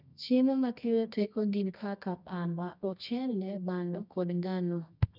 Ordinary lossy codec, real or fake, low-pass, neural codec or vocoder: none; fake; 5.4 kHz; codec, 24 kHz, 0.9 kbps, WavTokenizer, medium music audio release